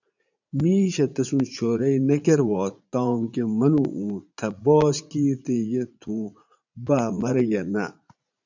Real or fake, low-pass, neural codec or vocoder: fake; 7.2 kHz; vocoder, 44.1 kHz, 80 mel bands, Vocos